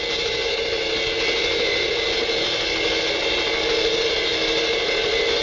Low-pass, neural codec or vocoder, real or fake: 7.2 kHz; none; real